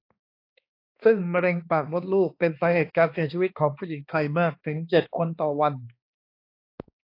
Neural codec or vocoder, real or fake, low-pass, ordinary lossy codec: codec, 16 kHz, 2 kbps, X-Codec, HuBERT features, trained on balanced general audio; fake; 5.4 kHz; AAC, 32 kbps